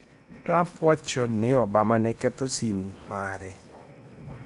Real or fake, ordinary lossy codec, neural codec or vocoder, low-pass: fake; none; codec, 16 kHz in and 24 kHz out, 0.8 kbps, FocalCodec, streaming, 65536 codes; 10.8 kHz